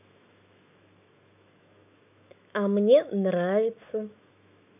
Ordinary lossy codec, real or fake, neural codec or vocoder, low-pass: none; fake; autoencoder, 48 kHz, 128 numbers a frame, DAC-VAE, trained on Japanese speech; 3.6 kHz